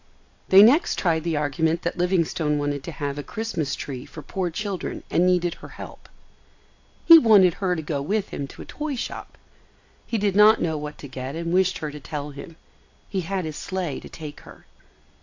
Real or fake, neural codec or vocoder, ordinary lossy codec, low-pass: real; none; AAC, 48 kbps; 7.2 kHz